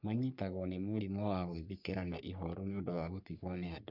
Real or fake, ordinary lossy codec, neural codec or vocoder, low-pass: fake; none; codec, 44.1 kHz, 3.4 kbps, Pupu-Codec; 5.4 kHz